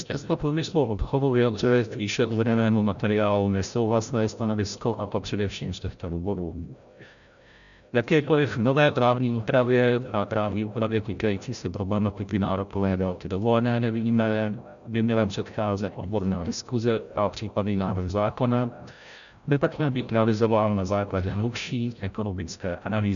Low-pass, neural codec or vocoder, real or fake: 7.2 kHz; codec, 16 kHz, 0.5 kbps, FreqCodec, larger model; fake